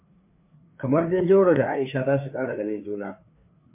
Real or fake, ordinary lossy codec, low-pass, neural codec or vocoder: fake; MP3, 24 kbps; 3.6 kHz; codec, 16 kHz, 4 kbps, FreqCodec, larger model